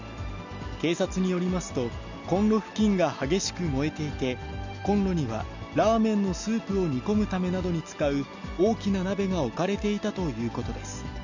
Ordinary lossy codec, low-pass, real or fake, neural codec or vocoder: none; 7.2 kHz; real; none